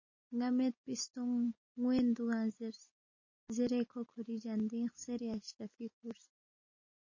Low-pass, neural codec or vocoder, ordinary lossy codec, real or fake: 7.2 kHz; none; MP3, 32 kbps; real